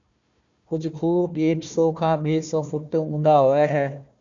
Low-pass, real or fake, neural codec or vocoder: 7.2 kHz; fake; codec, 16 kHz, 1 kbps, FunCodec, trained on Chinese and English, 50 frames a second